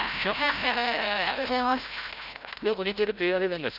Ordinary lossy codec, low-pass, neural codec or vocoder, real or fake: none; 5.4 kHz; codec, 16 kHz, 0.5 kbps, FreqCodec, larger model; fake